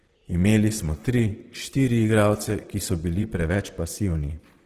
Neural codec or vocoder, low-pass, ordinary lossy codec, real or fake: vocoder, 44.1 kHz, 128 mel bands, Pupu-Vocoder; 14.4 kHz; Opus, 16 kbps; fake